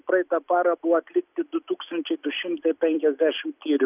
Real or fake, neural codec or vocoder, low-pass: real; none; 3.6 kHz